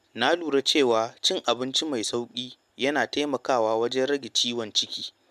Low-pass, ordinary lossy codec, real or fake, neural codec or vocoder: 14.4 kHz; MP3, 96 kbps; real; none